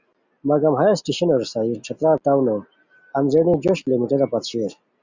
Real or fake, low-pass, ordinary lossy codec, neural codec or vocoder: real; 7.2 kHz; Opus, 64 kbps; none